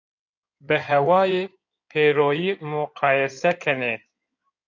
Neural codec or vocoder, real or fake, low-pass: codec, 16 kHz in and 24 kHz out, 2.2 kbps, FireRedTTS-2 codec; fake; 7.2 kHz